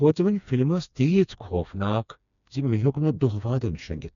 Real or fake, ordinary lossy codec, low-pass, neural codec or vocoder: fake; none; 7.2 kHz; codec, 16 kHz, 2 kbps, FreqCodec, smaller model